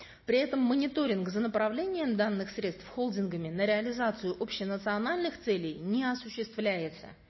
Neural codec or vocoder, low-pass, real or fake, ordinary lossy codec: none; 7.2 kHz; real; MP3, 24 kbps